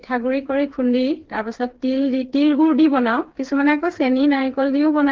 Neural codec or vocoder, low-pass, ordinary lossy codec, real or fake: codec, 16 kHz, 4 kbps, FreqCodec, smaller model; 7.2 kHz; Opus, 16 kbps; fake